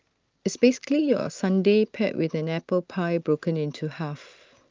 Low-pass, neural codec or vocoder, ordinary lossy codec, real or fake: 7.2 kHz; none; Opus, 24 kbps; real